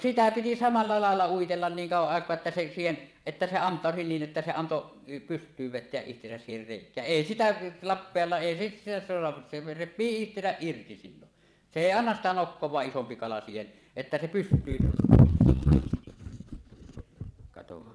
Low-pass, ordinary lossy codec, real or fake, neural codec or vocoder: none; none; fake; vocoder, 22.05 kHz, 80 mel bands, WaveNeXt